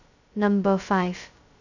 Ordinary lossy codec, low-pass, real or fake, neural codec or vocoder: none; 7.2 kHz; fake; codec, 16 kHz, 0.2 kbps, FocalCodec